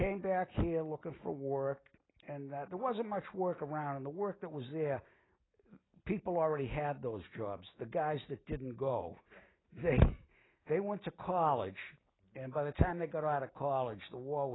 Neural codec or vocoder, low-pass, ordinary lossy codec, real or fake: none; 7.2 kHz; AAC, 16 kbps; real